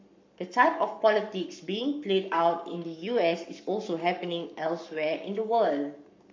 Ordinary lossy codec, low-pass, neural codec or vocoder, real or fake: MP3, 64 kbps; 7.2 kHz; codec, 44.1 kHz, 7.8 kbps, Pupu-Codec; fake